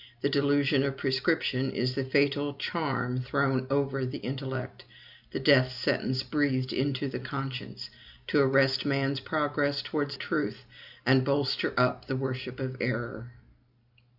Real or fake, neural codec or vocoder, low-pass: real; none; 5.4 kHz